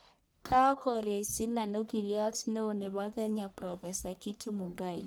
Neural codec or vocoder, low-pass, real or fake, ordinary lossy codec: codec, 44.1 kHz, 1.7 kbps, Pupu-Codec; none; fake; none